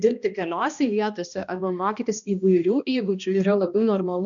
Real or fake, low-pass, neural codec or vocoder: fake; 7.2 kHz; codec, 16 kHz, 1 kbps, X-Codec, HuBERT features, trained on balanced general audio